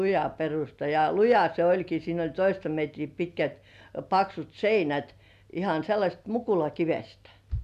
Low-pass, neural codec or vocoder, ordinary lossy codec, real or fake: 14.4 kHz; none; none; real